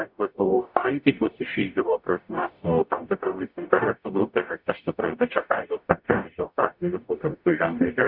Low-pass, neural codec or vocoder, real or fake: 5.4 kHz; codec, 44.1 kHz, 0.9 kbps, DAC; fake